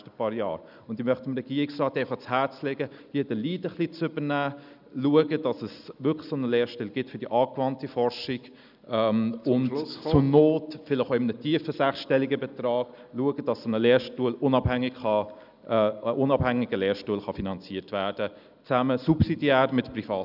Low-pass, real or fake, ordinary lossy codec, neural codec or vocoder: 5.4 kHz; real; none; none